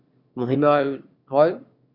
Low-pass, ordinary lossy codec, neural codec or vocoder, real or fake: 5.4 kHz; Opus, 64 kbps; autoencoder, 22.05 kHz, a latent of 192 numbers a frame, VITS, trained on one speaker; fake